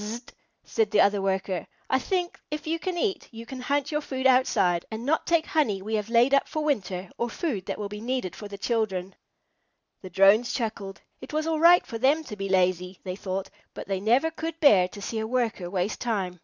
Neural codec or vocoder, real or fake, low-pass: none; real; 7.2 kHz